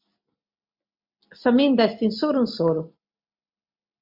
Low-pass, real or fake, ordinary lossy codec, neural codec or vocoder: 5.4 kHz; real; MP3, 48 kbps; none